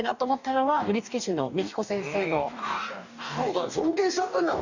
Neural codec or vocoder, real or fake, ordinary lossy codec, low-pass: codec, 44.1 kHz, 2.6 kbps, DAC; fake; none; 7.2 kHz